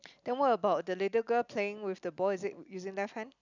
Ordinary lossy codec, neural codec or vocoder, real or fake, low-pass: none; none; real; 7.2 kHz